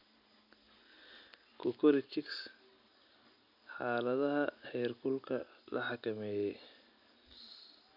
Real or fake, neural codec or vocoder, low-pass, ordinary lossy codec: real; none; 5.4 kHz; none